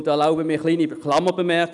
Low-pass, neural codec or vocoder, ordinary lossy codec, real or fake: 10.8 kHz; none; none; real